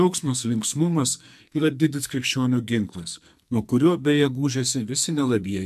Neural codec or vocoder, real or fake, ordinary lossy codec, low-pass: codec, 44.1 kHz, 2.6 kbps, SNAC; fake; MP3, 96 kbps; 14.4 kHz